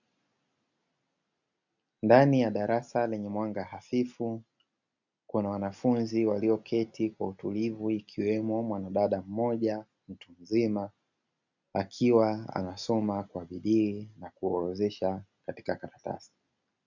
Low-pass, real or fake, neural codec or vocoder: 7.2 kHz; real; none